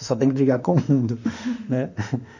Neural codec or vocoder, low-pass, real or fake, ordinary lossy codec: autoencoder, 48 kHz, 32 numbers a frame, DAC-VAE, trained on Japanese speech; 7.2 kHz; fake; none